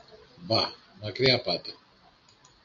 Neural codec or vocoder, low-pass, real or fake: none; 7.2 kHz; real